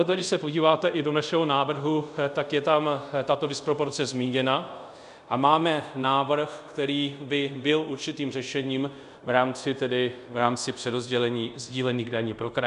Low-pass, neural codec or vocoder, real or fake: 10.8 kHz; codec, 24 kHz, 0.5 kbps, DualCodec; fake